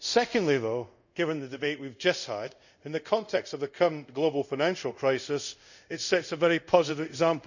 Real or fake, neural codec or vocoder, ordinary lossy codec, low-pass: fake; codec, 24 kHz, 0.5 kbps, DualCodec; none; 7.2 kHz